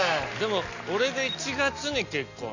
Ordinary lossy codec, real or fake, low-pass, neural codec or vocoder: none; real; 7.2 kHz; none